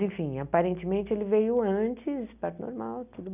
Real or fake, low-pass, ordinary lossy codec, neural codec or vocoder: real; 3.6 kHz; none; none